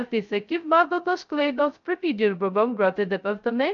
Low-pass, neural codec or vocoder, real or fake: 7.2 kHz; codec, 16 kHz, 0.2 kbps, FocalCodec; fake